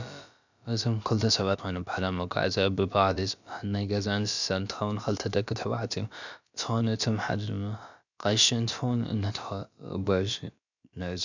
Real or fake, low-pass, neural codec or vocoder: fake; 7.2 kHz; codec, 16 kHz, about 1 kbps, DyCAST, with the encoder's durations